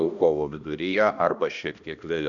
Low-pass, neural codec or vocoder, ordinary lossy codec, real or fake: 7.2 kHz; codec, 16 kHz, 1 kbps, X-Codec, HuBERT features, trained on balanced general audio; Opus, 64 kbps; fake